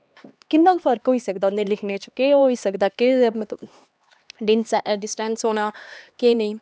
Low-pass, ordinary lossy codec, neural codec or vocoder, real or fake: none; none; codec, 16 kHz, 2 kbps, X-Codec, HuBERT features, trained on LibriSpeech; fake